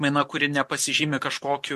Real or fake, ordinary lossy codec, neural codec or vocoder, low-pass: real; MP3, 64 kbps; none; 14.4 kHz